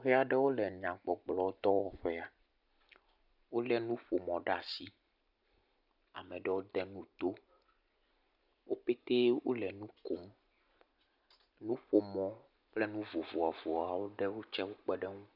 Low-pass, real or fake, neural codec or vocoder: 5.4 kHz; real; none